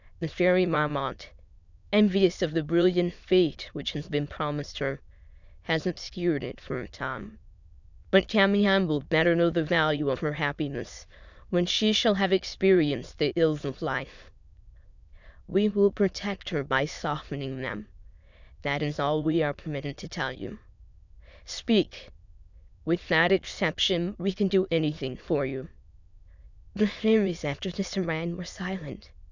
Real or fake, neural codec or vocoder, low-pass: fake; autoencoder, 22.05 kHz, a latent of 192 numbers a frame, VITS, trained on many speakers; 7.2 kHz